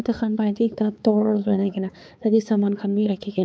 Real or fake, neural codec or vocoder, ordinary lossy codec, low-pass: fake; codec, 16 kHz, 4 kbps, X-Codec, HuBERT features, trained on balanced general audio; none; none